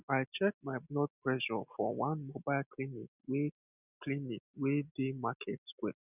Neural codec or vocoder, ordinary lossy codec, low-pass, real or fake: none; none; 3.6 kHz; real